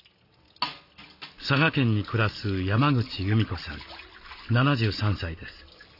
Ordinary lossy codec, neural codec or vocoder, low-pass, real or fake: none; none; 5.4 kHz; real